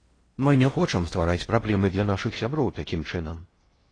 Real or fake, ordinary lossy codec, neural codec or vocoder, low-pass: fake; AAC, 32 kbps; codec, 16 kHz in and 24 kHz out, 0.6 kbps, FocalCodec, streaming, 4096 codes; 9.9 kHz